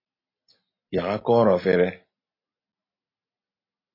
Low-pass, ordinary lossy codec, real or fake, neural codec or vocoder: 5.4 kHz; MP3, 24 kbps; real; none